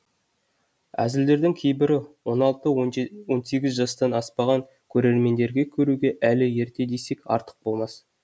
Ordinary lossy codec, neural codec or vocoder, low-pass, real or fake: none; none; none; real